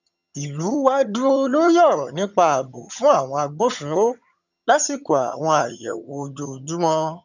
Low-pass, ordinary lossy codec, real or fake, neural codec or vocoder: 7.2 kHz; none; fake; vocoder, 22.05 kHz, 80 mel bands, HiFi-GAN